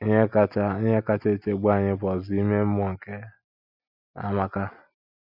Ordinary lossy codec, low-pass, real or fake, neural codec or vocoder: none; 5.4 kHz; real; none